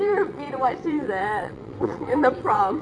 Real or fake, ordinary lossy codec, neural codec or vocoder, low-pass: fake; none; vocoder, 22.05 kHz, 80 mel bands, WaveNeXt; 9.9 kHz